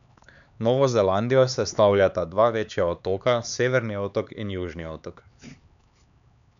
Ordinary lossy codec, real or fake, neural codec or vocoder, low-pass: none; fake; codec, 16 kHz, 4 kbps, X-Codec, HuBERT features, trained on LibriSpeech; 7.2 kHz